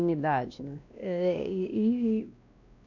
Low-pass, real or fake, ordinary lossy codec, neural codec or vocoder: 7.2 kHz; fake; none; codec, 16 kHz, 1 kbps, X-Codec, WavLM features, trained on Multilingual LibriSpeech